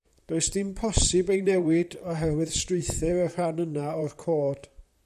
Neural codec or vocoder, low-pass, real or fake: vocoder, 44.1 kHz, 128 mel bands, Pupu-Vocoder; 14.4 kHz; fake